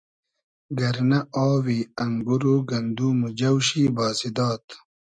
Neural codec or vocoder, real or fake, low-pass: none; real; 9.9 kHz